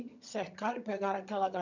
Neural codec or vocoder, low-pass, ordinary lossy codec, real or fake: vocoder, 22.05 kHz, 80 mel bands, HiFi-GAN; 7.2 kHz; none; fake